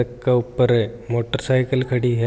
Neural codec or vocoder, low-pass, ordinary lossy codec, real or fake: none; none; none; real